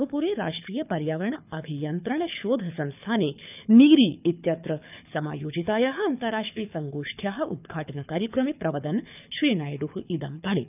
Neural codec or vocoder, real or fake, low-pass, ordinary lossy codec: codec, 24 kHz, 6 kbps, HILCodec; fake; 3.6 kHz; none